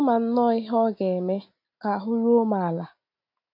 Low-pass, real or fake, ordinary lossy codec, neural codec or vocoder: 5.4 kHz; real; MP3, 32 kbps; none